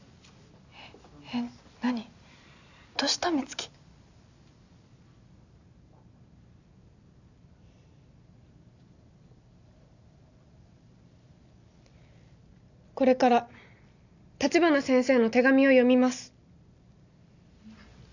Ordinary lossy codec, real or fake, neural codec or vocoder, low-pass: none; real; none; 7.2 kHz